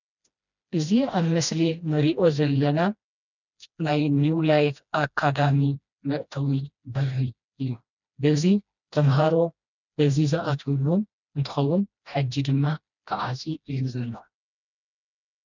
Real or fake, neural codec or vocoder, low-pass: fake; codec, 16 kHz, 1 kbps, FreqCodec, smaller model; 7.2 kHz